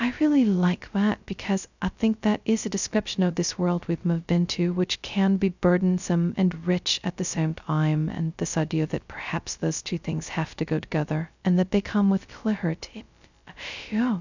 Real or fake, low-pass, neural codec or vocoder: fake; 7.2 kHz; codec, 16 kHz, 0.2 kbps, FocalCodec